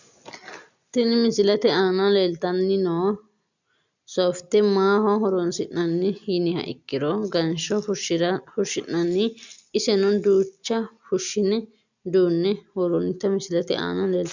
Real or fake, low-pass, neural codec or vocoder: real; 7.2 kHz; none